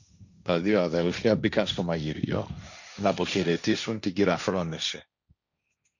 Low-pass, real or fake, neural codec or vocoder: 7.2 kHz; fake; codec, 16 kHz, 1.1 kbps, Voila-Tokenizer